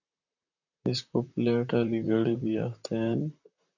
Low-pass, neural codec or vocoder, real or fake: 7.2 kHz; vocoder, 44.1 kHz, 128 mel bands, Pupu-Vocoder; fake